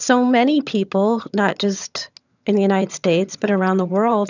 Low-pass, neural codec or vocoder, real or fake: 7.2 kHz; vocoder, 22.05 kHz, 80 mel bands, HiFi-GAN; fake